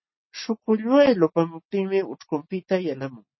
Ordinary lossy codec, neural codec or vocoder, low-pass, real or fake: MP3, 24 kbps; none; 7.2 kHz; real